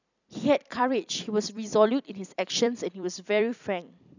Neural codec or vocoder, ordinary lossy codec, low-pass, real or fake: vocoder, 44.1 kHz, 128 mel bands every 256 samples, BigVGAN v2; none; 7.2 kHz; fake